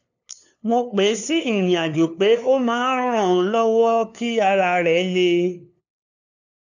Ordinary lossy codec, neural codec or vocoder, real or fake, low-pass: none; codec, 16 kHz, 2 kbps, FunCodec, trained on LibriTTS, 25 frames a second; fake; 7.2 kHz